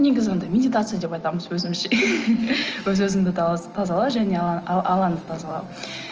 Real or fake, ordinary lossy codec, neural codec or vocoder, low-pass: real; Opus, 24 kbps; none; 7.2 kHz